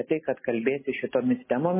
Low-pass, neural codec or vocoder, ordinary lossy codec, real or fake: 3.6 kHz; none; MP3, 16 kbps; real